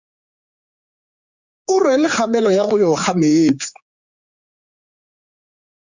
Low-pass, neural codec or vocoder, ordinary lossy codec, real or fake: 7.2 kHz; codec, 16 kHz, 4 kbps, X-Codec, HuBERT features, trained on general audio; Opus, 64 kbps; fake